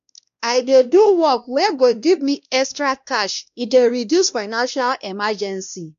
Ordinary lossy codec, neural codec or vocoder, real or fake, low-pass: none; codec, 16 kHz, 1 kbps, X-Codec, WavLM features, trained on Multilingual LibriSpeech; fake; 7.2 kHz